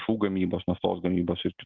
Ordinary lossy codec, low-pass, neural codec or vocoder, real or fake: Opus, 32 kbps; 7.2 kHz; none; real